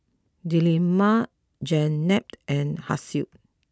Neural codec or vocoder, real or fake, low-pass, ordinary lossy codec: none; real; none; none